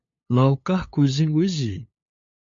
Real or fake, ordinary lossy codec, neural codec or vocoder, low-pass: fake; MP3, 48 kbps; codec, 16 kHz, 8 kbps, FunCodec, trained on LibriTTS, 25 frames a second; 7.2 kHz